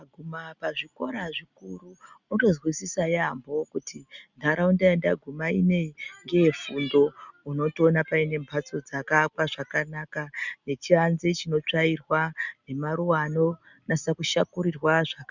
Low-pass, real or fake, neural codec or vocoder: 7.2 kHz; real; none